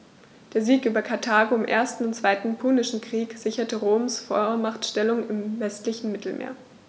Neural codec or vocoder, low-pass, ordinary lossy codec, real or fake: none; none; none; real